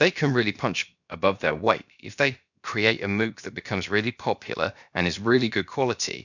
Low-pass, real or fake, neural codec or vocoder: 7.2 kHz; fake; codec, 16 kHz, about 1 kbps, DyCAST, with the encoder's durations